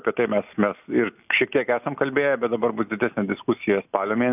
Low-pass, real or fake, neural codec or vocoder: 3.6 kHz; real; none